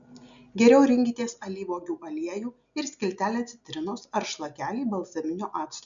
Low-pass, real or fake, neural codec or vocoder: 7.2 kHz; real; none